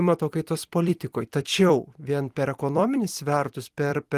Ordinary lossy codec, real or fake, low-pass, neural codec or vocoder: Opus, 24 kbps; fake; 14.4 kHz; vocoder, 44.1 kHz, 128 mel bands every 256 samples, BigVGAN v2